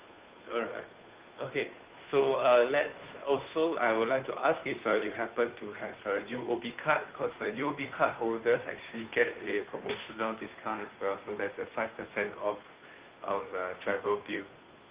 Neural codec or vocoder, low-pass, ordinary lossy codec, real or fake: codec, 16 kHz, 2 kbps, FunCodec, trained on Chinese and English, 25 frames a second; 3.6 kHz; Opus, 24 kbps; fake